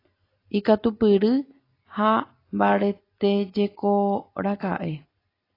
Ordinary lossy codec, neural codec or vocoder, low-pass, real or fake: AAC, 24 kbps; none; 5.4 kHz; real